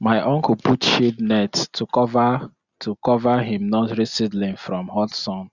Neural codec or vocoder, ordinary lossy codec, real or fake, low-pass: none; Opus, 64 kbps; real; 7.2 kHz